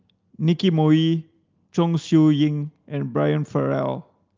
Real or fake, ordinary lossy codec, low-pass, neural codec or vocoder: real; Opus, 32 kbps; 7.2 kHz; none